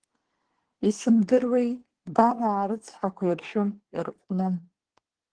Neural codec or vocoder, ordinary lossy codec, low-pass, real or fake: codec, 24 kHz, 1 kbps, SNAC; Opus, 16 kbps; 9.9 kHz; fake